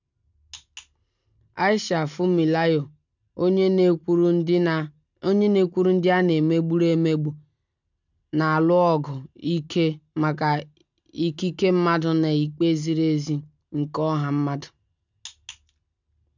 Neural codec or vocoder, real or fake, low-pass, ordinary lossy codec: none; real; 7.2 kHz; none